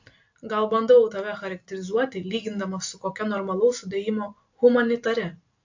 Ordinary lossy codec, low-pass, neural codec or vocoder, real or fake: AAC, 48 kbps; 7.2 kHz; none; real